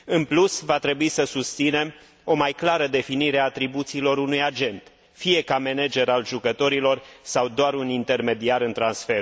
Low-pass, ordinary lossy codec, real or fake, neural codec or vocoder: none; none; real; none